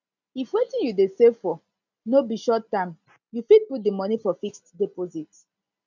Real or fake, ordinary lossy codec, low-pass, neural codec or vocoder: real; none; 7.2 kHz; none